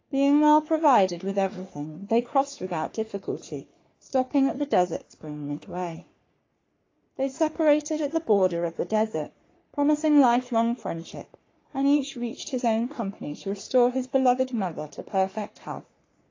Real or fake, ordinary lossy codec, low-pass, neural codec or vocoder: fake; AAC, 32 kbps; 7.2 kHz; codec, 44.1 kHz, 3.4 kbps, Pupu-Codec